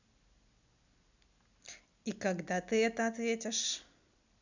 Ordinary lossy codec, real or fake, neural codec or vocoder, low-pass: none; real; none; 7.2 kHz